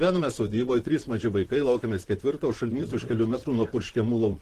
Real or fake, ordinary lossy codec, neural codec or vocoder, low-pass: fake; Opus, 16 kbps; vocoder, 44.1 kHz, 128 mel bands, Pupu-Vocoder; 14.4 kHz